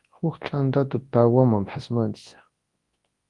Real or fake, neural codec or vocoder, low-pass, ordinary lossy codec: fake; codec, 24 kHz, 0.9 kbps, WavTokenizer, large speech release; 10.8 kHz; Opus, 24 kbps